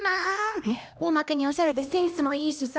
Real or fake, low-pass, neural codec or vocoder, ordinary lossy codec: fake; none; codec, 16 kHz, 1 kbps, X-Codec, HuBERT features, trained on LibriSpeech; none